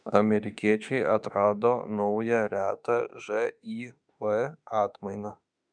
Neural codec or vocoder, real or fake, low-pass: autoencoder, 48 kHz, 32 numbers a frame, DAC-VAE, trained on Japanese speech; fake; 9.9 kHz